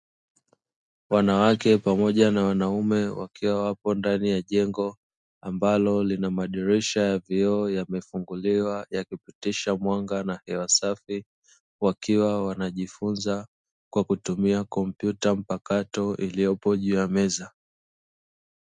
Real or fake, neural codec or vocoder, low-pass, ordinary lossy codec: real; none; 10.8 kHz; MP3, 96 kbps